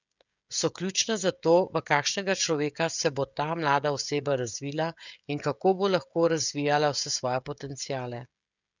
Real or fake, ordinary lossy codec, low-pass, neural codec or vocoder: fake; none; 7.2 kHz; codec, 16 kHz, 16 kbps, FreqCodec, smaller model